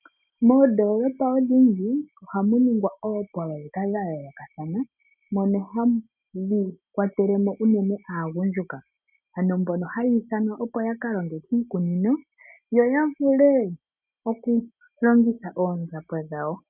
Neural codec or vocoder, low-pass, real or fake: none; 3.6 kHz; real